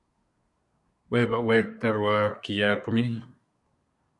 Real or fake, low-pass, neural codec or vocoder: fake; 10.8 kHz; codec, 24 kHz, 1 kbps, SNAC